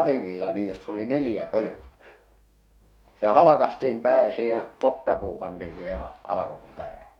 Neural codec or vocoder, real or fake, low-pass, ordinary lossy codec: codec, 44.1 kHz, 2.6 kbps, DAC; fake; 19.8 kHz; none